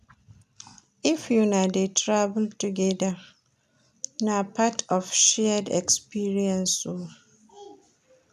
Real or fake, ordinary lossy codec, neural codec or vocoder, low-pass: real; none; none; 14.4 kHz